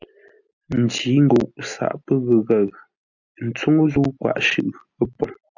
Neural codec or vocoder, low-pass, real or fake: none; 7.2 kHz; real